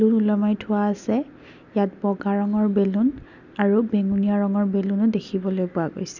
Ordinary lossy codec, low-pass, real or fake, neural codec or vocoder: none; 7.2 kHz; real; none